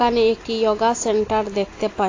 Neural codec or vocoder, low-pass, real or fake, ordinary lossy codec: none; 7.2 kHz; real; AAC, 32 kbps